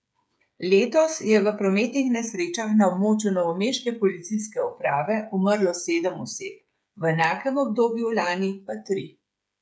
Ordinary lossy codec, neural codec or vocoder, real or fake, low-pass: none; codec, 16 kHz, 8 kbps, FreqCodec, smaller model; fake; none